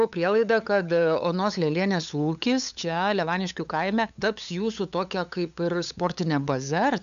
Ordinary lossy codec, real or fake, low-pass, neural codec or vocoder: AAC, 96 kbps; fake; 7.2 kHz; codec, 16 kHz, 8 kbps, FunCodec, trained on LibriTTS, 25 frames a second